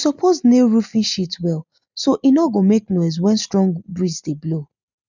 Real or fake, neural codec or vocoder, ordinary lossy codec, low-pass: real; none; none; 7.2 kHz